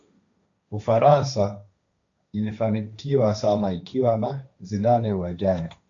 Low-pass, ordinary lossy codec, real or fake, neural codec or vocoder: 7.2 kHz; AAC, 64 kbps; fake; codec, 16 kHz, 1.1 kbps, Voila-Tokenizer